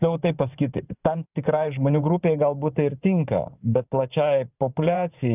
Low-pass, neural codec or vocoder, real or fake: 3.6 kHz; vocoder, 44.1 kHz, 128 mel bands every 512 samples, BigVGAN v2; fake